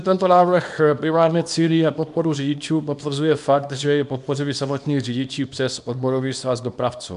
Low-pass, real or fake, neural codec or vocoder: 10.8 kHz; fake; codec, 24 kHz, 0.9 kbps, WavTokenizer, small release